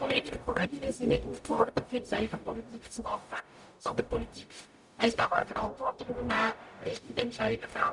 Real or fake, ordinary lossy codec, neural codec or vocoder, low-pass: fake; none; codec, 44.1 kHz, 0.9 kbps, DAC; 10.8 kHz